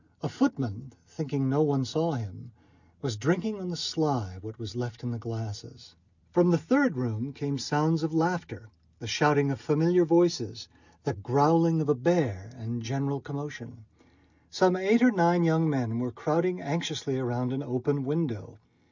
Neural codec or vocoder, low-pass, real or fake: none; 7.2 kHz; real